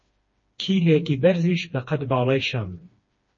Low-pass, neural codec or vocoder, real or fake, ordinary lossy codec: 7.2 kHz; codec, 16 kHz, 2 kbps, FreqCodec, smaller model; fake; MP3, 32 kbps